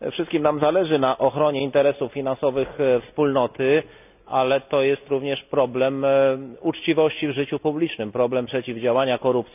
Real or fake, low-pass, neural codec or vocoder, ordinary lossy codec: real; 3.6 kHz; none; none